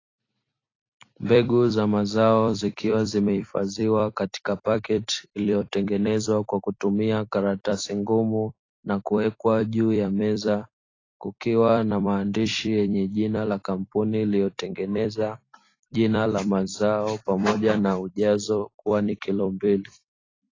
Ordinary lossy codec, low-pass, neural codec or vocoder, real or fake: AAC, 32 kbps; 7.2 kHz; vocoder, 44.1 kHz, 128 mel bands every 256 samples, BigVGAN v2; fake